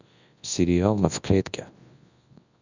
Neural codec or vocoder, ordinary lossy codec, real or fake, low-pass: codec, 24 kHz, 0.9 kbps, WavTokenizer, large speech release; Opus, 64 kbps; fake; 7.2 kHz